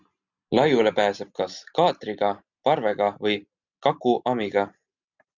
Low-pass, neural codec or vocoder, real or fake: 7.2 kHz; none; real